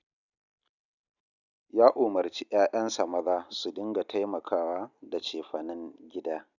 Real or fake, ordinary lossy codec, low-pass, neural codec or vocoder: real; none; 7.2 kHz; none